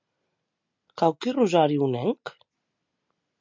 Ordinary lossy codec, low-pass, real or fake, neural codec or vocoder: MP3, 64 kbps; 7.2 kHz; real; none